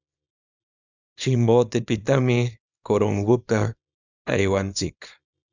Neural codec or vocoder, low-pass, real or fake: codec, 24 kHz, 0.9 kbps, WavTokenizer, small release; 7.2 kHz; fake